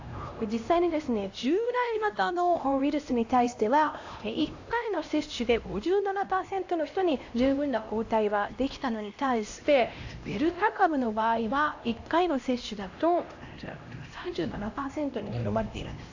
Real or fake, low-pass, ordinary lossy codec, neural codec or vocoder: fake; 7.2 kHz; AAC, 48 kbps; codec, 16 kHz, 1 kbps, X-Codec, HuBERT features, trained on LibriSpeech